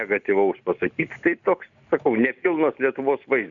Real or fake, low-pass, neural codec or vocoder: real; 7.2 kHz; none